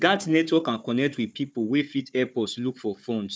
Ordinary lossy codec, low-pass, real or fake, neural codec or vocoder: none; none; fake; codec, 16 kHz, 4 kbps, FunCodec, trained on Chinese and English, 50 frames a second